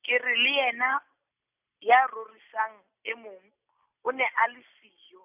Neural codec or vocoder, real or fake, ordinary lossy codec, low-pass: none; real; none; 3.6 kHz